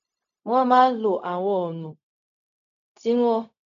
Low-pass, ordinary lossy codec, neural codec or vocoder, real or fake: 7.2 kHz; none; codec, 16 kHz, 0.4 kbps, LongCat-Audio-Codec; fake